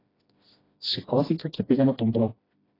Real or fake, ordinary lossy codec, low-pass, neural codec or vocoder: fake; AAC, 24 kbps; 5.4 kHz; codec, 16 kHz, 1 kbps, FreqCodec, smaller model